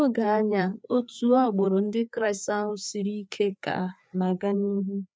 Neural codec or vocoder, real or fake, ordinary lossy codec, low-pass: codec, 16 kHz, 4 kbps, FreqCodec, larger model; fake; none; none